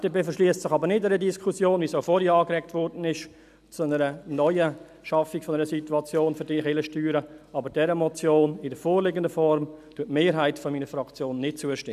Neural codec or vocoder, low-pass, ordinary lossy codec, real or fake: none; 14.4 kHz; none; real